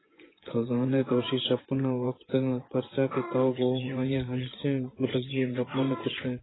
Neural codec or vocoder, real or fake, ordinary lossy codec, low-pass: vocoder, 24 kHz, 100 mel bands, Vocos; fake; AAC, 16 kbps; 7.2 kHz